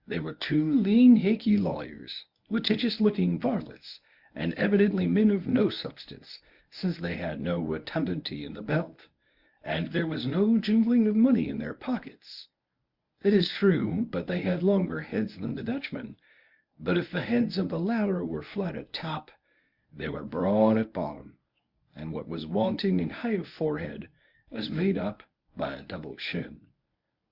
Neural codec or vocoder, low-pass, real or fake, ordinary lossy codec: codec, 24 kHz, 0.9 kbps, WavTokenizer, medium speech release version 1; 5.4 kHz; fake; Opus, 64 kbps